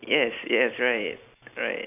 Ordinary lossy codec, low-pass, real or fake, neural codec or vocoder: none; 3.6 kHz; real; none